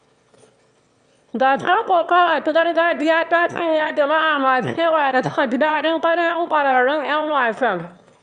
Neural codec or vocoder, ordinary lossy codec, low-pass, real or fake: autoencoder, 22.05 kHz, a latent of 192 numbers a frame, VITS, trained on one speaker; Opus, 64 kbps; 9.9 kHz; fake